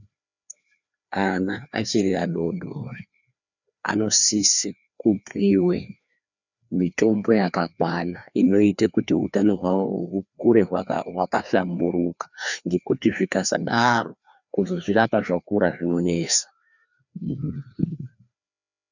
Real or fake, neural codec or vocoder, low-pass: fake; codec, 16 kHz, 2 kbps, FreqCodec, larger model; 7.2 kHz